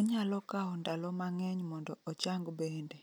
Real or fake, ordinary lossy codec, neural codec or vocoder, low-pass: real; none; none; none